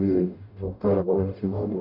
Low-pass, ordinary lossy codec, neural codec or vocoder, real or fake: 5.4 kHz; none; codec, 44.1 kHz, 0.9 kbps, DAC; fake